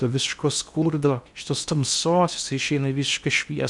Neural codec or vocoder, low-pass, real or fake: codec, 16 kHz in and 24 kHz out, 0.6 kbps, FocalCodec, streaming, 2048 codes; 10.8 kHz; fake